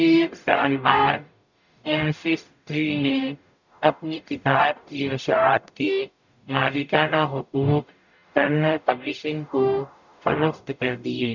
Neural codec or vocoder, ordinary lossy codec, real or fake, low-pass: codec, 44.1 kHz, 0.9 kbps, DAC; none; fake; 7.2 kHz